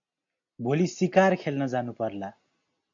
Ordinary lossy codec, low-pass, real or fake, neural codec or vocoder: AAC, 48 kbps; 7.2 kHz; real; none